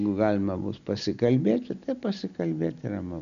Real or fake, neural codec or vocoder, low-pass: real; none; 7.2 kHz